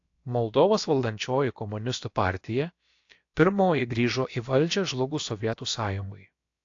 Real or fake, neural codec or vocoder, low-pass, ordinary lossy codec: fake; codec, 16 kHz, about 1 kbps, DyCAST, with the encoder's durations; 7.2 kHz; AAC, 48 kbps